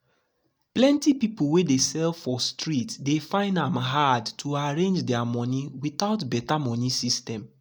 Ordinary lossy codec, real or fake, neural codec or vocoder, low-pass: none; real; none; none